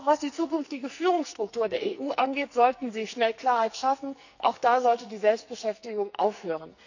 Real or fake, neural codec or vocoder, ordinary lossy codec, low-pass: fake; codec, 44.1 kHz, 2.6 kbps, SNAC; none; 7.2 kHz